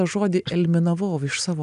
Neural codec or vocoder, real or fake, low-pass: none; real; 10.8 kHz